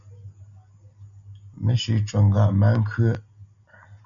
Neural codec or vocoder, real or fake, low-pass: none; real; 7.2 kHz